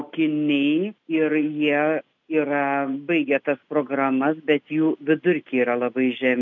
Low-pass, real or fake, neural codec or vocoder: 7.2 kHz; real; none